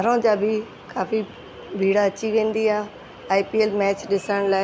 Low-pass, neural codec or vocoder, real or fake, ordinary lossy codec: none; none; real; none